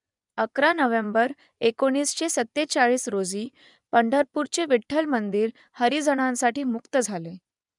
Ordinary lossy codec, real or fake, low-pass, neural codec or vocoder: none; fake; 10.8 kHz; vocoder, 24 kHz, 100 mel bands, Vocos